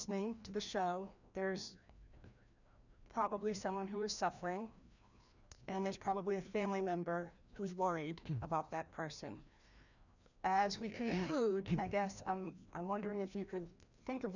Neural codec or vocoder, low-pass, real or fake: codec, 16 kHz, 1 kbps, FreqCodec, larger model; 7.2 kHz; fake